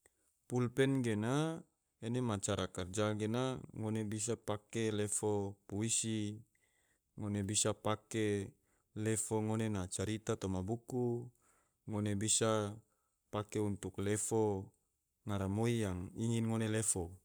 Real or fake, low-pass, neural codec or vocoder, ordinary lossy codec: fake; none; codec, 44.1 kHz, 7.8 kbps, Pupu-Codec; none